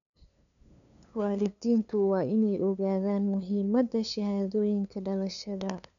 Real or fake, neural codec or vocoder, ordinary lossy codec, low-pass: fake; codec, 16 kHz, 2 kbps, FunCodec, trained on LibriTTS, 25 frames a second; none; 7.2 kHz